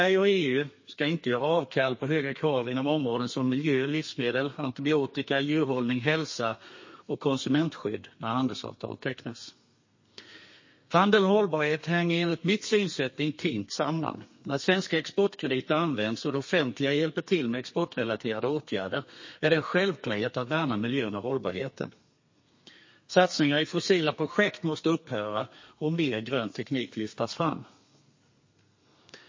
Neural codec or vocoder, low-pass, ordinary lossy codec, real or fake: codec, 32 kHz, 1.9 kbps, SNAC; 7.2 kHz; MP3, 32 kbps; fake